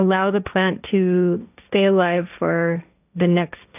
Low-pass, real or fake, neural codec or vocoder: 3.6 kHz; fake; codec, 16 kHz, 1.1 kbps, Voila-Tokenizer